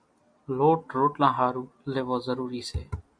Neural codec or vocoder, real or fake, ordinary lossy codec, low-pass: none; real; MP3, 64 kbps; 9.9 kHz